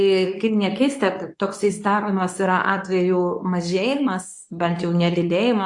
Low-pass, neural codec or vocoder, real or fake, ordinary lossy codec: 10.8 kHz; codec, 24 kHz, 0.9 kbps, WavTokenizer, medium speech release version 2; fake; AAC, 64 kbps